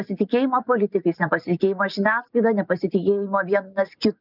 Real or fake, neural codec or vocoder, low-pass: real; none; 5.4 kHz